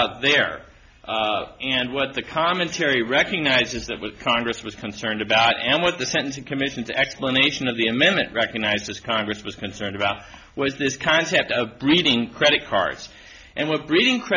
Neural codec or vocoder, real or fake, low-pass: none; real; 7.2 kHz